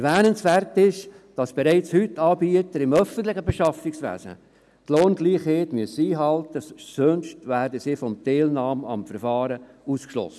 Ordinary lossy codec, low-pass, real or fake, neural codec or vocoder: none; none; real; none